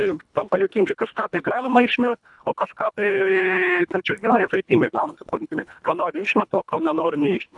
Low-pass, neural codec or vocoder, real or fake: 10.8 kHz; codec, 24 kHz, 1.5 kbps, HILCodec; fake